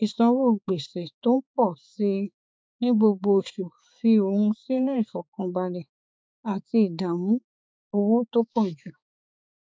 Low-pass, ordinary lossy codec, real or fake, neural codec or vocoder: none; none; fake; codec, 16 kHz, 4 kbps, X-Codec, HuBERT features, trained on balanced general audio